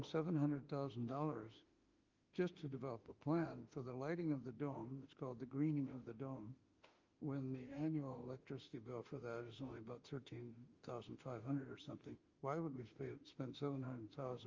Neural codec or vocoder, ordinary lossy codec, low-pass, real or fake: autoencoder, 48 kHz, 32 numbers a frame, DAC-VAE, trained on Japanese speech; Opus, 32 kbps; 7.2 kHz; fake